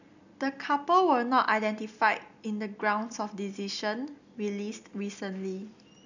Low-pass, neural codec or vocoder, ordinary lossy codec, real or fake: 7.2 kHz; none; none; real